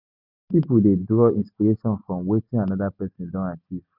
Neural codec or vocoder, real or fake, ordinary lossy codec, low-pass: none; real; none; 5.4 kHz